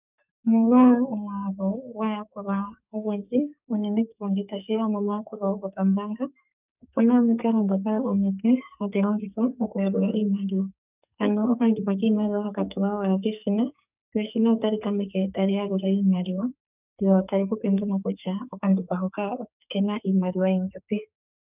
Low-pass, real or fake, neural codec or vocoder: 3.6 kHz; fake; codec, 44.1 kHz, 2.6 kbps, SNAC